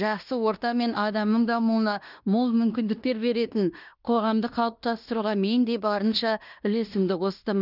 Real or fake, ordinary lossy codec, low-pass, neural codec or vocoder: fake; none; 5.4 kHz; codec, 16 kHz in and 24 kHz out, 0.9 kbps, LongCat-Audio-Codec, fine tuned four codebook decoder